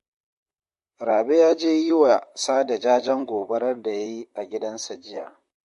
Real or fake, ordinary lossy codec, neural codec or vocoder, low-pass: fake; MP3, 48 kbps; vocoder, 44.1 kHz, 128 mel bands, Pupu-Vocoder; 14.4 kHz